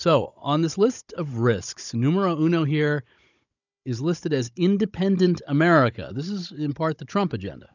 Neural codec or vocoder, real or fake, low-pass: codec, 16 kHz, 16 kbps, FunCodec, trained on Chinese and English, 50 frames a second; fake; 7.2 kHz